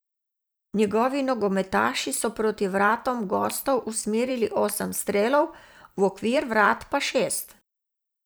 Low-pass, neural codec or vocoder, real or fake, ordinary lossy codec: none; vocoder, 44.1 kHz, 128 mel bands every 512 samples, BigVGAN v2; fake; none